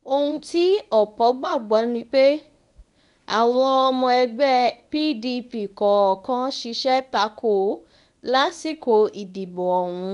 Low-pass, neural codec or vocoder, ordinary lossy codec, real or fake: 10.8 kHz; codec, 24 kHz, 0.9 kbps, WavTokenizer, medium speech release version 1; none; fake